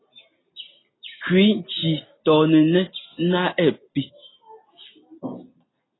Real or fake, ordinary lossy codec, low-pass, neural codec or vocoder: real; AAC, 16 kbps; 7.2 kHz; none